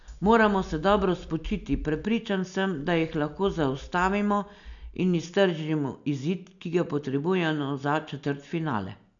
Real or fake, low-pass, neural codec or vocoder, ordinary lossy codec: real; 7.2 kHz; none; none